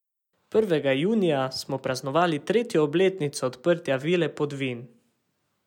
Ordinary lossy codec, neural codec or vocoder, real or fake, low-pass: none; none; real; 19.8 kHz